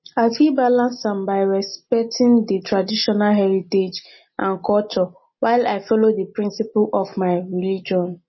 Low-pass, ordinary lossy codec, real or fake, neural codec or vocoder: 7.2 kHz; MP3, 24 kbps; real; none